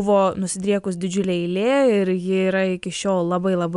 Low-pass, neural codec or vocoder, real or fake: 10.8 kHz; none; real